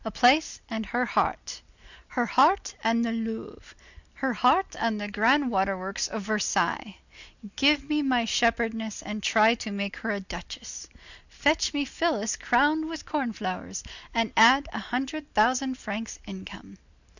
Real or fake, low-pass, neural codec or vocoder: real; 7.2 kHz; none